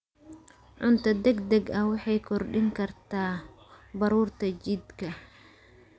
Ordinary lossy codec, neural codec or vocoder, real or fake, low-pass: none; none; real; none